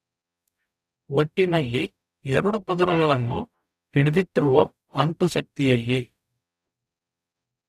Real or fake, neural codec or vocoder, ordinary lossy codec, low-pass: fake; codec, 44.1 kHz, 0.9 kbps, DAC; none; 14.4 kHz